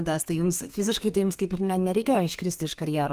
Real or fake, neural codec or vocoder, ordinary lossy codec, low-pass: fake; codec, 32 kHz, 1.9 kbps, SNAC; Opus, 32 kbps; 14.4 kHz